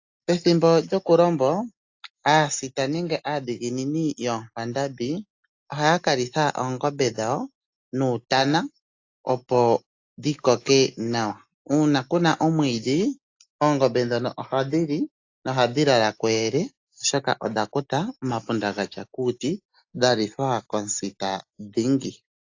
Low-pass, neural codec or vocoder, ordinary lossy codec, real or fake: 7.2 kHz; none; AAC, 48 kbps; real